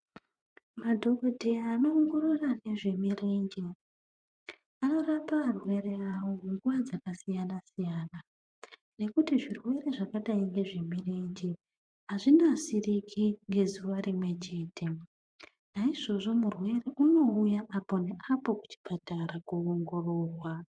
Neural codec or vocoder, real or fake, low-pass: vocoder, 24 kHz, 100 mel bands, Vocos; fake; 9.9 kHz